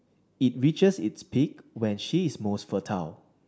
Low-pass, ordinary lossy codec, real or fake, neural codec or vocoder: none; none; real; none